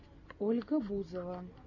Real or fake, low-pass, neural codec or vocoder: real; 7.2 kHz; none